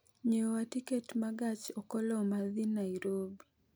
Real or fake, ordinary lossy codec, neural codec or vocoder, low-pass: real; none; none; none